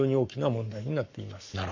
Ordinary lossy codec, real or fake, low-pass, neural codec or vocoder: Opus, 64 kbps; fake; 7.2 kHz; codec, 44.1 kHz, 7.8 kbps, Pupu-Codec